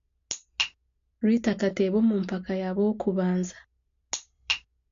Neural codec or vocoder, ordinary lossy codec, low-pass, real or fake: none; AAC, 48 kbps; 7.2 kHz; real